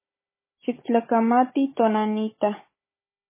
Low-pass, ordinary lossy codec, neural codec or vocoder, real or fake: 3.6 kHz; MP3, 16 kbps; codec, 16 kHz, 16 kbps, FunCodec, trained on Chinese and English, 50 frames a second; fake